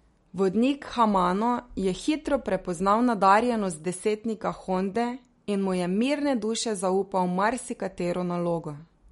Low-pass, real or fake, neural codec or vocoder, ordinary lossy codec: 19.8 kHz; real; none; MP3, 48 kbps